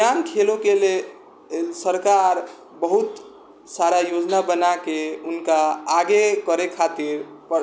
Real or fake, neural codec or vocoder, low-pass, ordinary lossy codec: real; none; none; none